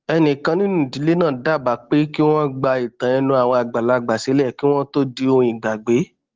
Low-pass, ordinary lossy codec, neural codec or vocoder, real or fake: 7.2 kHz; Opus, 16 kbps; none; real